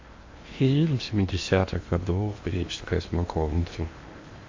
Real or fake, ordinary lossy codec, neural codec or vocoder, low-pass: fake; MP3, 48 kbps; codec, 16 kHz in and 24 kHz out, 0.8 kbps, FocalCodec, streaming, 65536 codes; 7.2 kHz